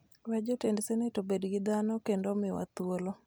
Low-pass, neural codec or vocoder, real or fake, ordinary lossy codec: none; none; real; none